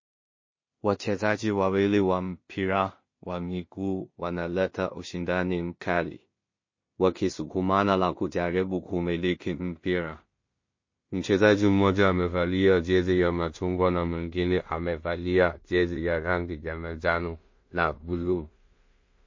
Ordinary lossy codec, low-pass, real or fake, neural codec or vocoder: MP3, 32 kbps; 7.2 kHz; fake; codec, 16 kHz in and 24 kHz out, 0.4 kbps, LongCat-Audio-Codec, two codebook decoder